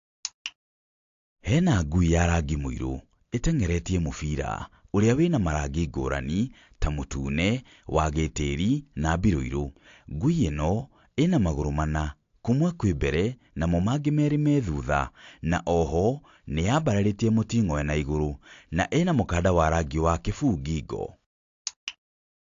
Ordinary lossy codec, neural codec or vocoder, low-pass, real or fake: AAC, 48 kbps; none; 7.2 kHz; real